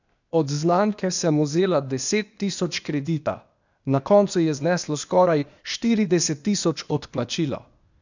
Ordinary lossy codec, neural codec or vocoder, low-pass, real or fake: none; codec, 16 kHz, 0.8 kbps, ZipCodec; 7.2 kHz; fake